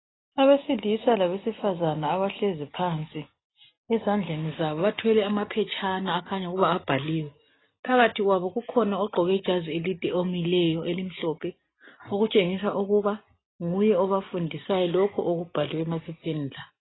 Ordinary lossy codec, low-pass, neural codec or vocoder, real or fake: AAC, 16 kbps; 7.2 kHz; none; real